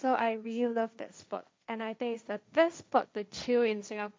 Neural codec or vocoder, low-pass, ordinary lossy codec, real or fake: codec, 16 kHz, 1.1 kbps, Voila-Tokenizer; none; none; fake